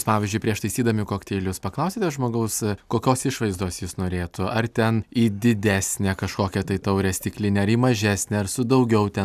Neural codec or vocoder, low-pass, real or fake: none; 14.4 kHz; real